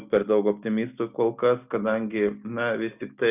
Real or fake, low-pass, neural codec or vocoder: real; 3.6 kHz; none